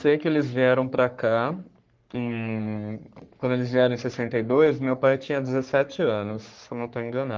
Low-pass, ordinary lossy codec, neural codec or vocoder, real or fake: 7.2 kHz; Opus, 32 kbps; codec, 44.1 kHz, 3.4 kbps, Pupu-Codec; fake